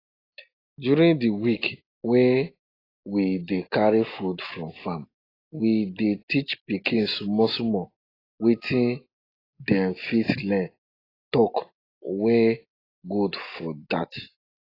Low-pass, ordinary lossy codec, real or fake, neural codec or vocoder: 5.4 kHz; AAC, 24 kbps; real; none